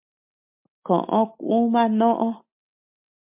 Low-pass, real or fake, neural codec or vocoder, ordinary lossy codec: 3.6 kHz; real; none; MP3, 32 kbps